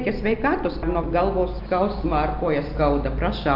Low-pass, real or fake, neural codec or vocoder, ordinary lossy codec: 5.4 kHz; real; none; Opus, 24 kbps